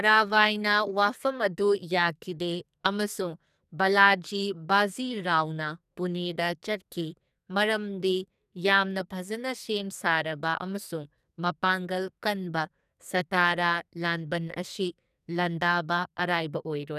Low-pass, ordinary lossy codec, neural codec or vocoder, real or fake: 14.4 kHz; none; codec, 44.1 kHz, 2.6 kbps, SNAC; fake